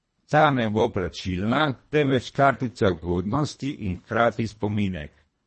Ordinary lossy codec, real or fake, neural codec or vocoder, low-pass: MP3, 32 kbps; fake; codec, 24 kHz, 1.5 kbps, HILCodec; 10.8 kHz